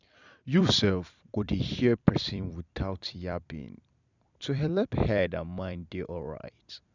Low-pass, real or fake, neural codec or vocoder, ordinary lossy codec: 7.2 kHz; fake; vocoder, 44.1 kHz, 128 mel bands every 256 samples, BigVGAN v2; none